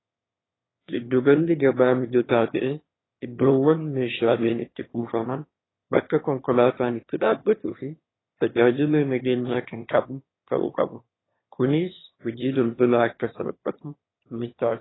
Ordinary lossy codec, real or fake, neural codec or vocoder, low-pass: AAC, 16 kbps; fake; autoencoder, 22.05 kHz, a latent of 192 numbers a frame, VITS, trained on one speaker; 7.2 kHz